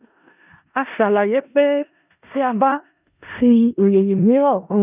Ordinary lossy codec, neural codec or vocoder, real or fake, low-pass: none; codec, 16 kHz in and 24 kHz out, 0.4 kbps, LongCat-Audio-Codec, four codebook decoder; fake; 3.6 kHz